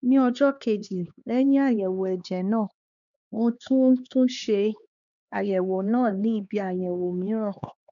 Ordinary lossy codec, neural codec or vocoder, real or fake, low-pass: none; codec, 16 kHz, 2 kbps, X-Codec, HuBERT features, trained on LibriSpeech; fake; 7.2 kHz